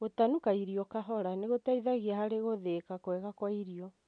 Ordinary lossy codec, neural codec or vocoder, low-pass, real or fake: none; none; 9.9 kHz; real